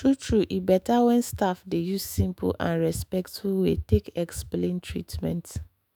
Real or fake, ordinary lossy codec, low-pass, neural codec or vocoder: fake; none; none; autoencoder, 48 kHz, 128 numbers a frame, DAC-VAE, trained on Japanese speech